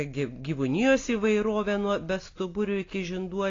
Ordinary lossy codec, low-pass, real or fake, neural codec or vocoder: AAC, 32 kbps; 7.2 kHz; real; none